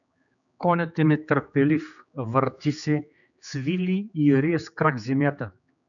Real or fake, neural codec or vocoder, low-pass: fake; codec, 16 kHz, 4 kbps, X-Codec, HuBERT features, trained on general audio; 7.2 kHz